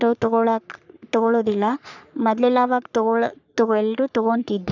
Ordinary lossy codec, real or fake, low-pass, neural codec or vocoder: none; fake; 7.2 kHz; codec, 44.1 kHz, 3.4 kbps, Pupu-Codec